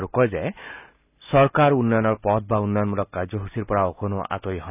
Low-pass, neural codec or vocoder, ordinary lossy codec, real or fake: 3.6 kHz; none; none; real